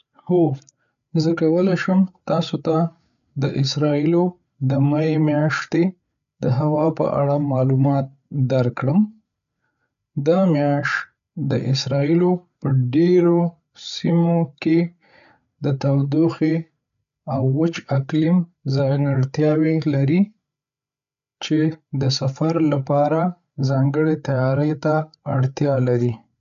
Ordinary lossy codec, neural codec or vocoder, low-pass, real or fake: none; codec, 16 kHz, 8 kbps, FreqCodec, larger model; 7.2 kHz; fake